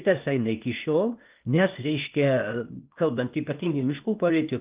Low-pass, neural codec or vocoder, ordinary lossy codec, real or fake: 3.6 kHz; codec, 16 kHz, 0.8 kbps, ZipCodec; Opus, 24 kbps; fake